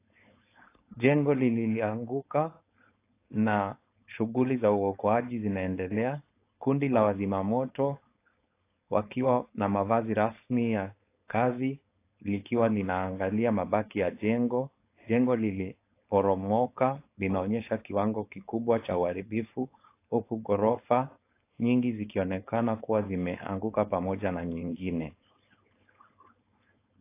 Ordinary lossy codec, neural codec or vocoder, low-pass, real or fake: AAC, 24 kbps; codec, 16 kHz, 4.8 kbps, FACodec; 3.6 kHz; fake